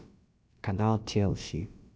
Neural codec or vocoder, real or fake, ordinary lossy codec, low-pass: codec, 16 kHz, about 1 kbps, DyCAST, with the encoder's durations; fake; none; none